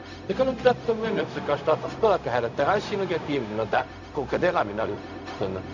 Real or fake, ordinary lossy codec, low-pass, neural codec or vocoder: fake; none; 7.2 kHz; codec, 16 kHz, 0.4 kbps, LongCat-Audio-Codec